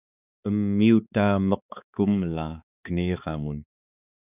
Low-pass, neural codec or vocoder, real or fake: 3.6 kHz; codec, 16 kHz, 4 kbps, X-Codec, HuBERT features, trained on balanced general audio; fake